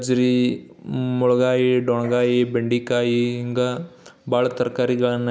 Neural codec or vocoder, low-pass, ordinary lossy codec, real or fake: none; none; none; real